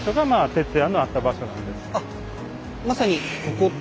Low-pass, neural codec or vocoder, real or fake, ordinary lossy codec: none; none; real; none